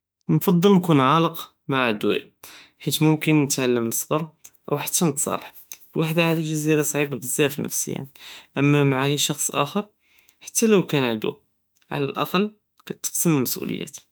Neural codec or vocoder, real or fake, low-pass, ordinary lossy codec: autoencoder, 48 kHz, 32 numbers a frame, DAC-VAE, trained on Japanese speech; fake; none; none